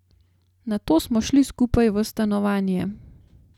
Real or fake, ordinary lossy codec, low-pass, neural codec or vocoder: real; none; 19.8 kHz; none